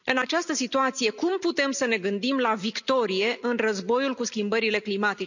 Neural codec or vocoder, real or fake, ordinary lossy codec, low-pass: none; real; none; 7.2 kHz